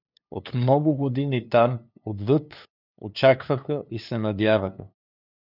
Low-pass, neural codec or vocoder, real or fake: 5.4 kHz; codec, 16 kHz, 2 kbps, FunCodec, trained on LibriTTS, 25 frames a second; fake